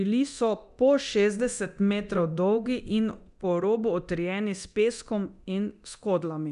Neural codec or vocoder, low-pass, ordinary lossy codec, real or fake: codec, 24 kHz, 0.9 kbps, DualCodec; 10.8 kHz; none; fake